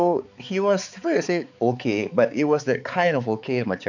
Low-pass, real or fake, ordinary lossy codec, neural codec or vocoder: 7.2 kHz; fake; none; codec, 16 kHz, 4 kbps, X-Codec, HuBERT features, trained on balanced general audio